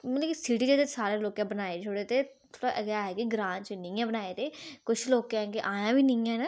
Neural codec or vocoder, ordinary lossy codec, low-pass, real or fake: none; none; none; real